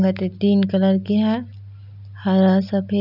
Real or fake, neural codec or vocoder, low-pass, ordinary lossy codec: real; none; 5.4 kHz; none